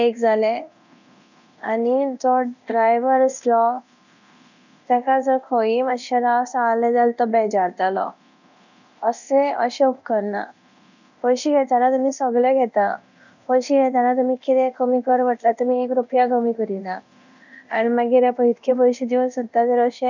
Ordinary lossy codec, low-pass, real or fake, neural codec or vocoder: none; 7.2 kHz; fake; codec, 24 kHz, 0.9 kbps, DualCodec